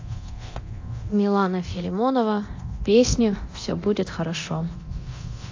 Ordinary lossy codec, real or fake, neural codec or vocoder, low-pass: AAC, 48 kbps; fake; codec, 24 kHz, 0.9 kbps, DualCodec; 7.2 kHz